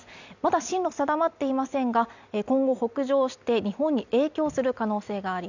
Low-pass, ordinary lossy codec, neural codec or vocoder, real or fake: 7.2 kHz; none; none; real